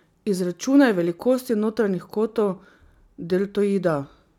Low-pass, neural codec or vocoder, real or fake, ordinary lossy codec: 19.8 kHz; none; real; none